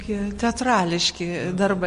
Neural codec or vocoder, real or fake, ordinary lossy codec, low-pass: none; real; MP3, 48 kbps; 10.8 kHz